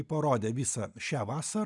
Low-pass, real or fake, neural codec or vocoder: 10.8 kHz; real; none